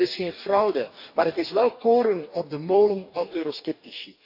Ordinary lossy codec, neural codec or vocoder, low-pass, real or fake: none; codec, 44.1 kHz, 2.6 kbps, DAC; 5.4 kHz; fake